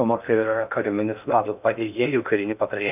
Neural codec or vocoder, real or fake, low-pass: codec, 16 kHz in and 24 kHz out, 0.6 kbps, FocalCodec, streaming, 4096 codes; fake; 3.6 kHz